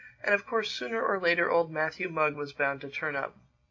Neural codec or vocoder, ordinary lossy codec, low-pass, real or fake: none; MP3, 48 kbps; 7.2 kHz; real